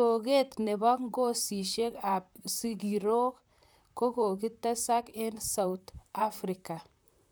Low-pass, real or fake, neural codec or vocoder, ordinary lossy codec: none; fake; vocoder, 44.1 kHz, 128 mel bands, Pupu-Vocoder; none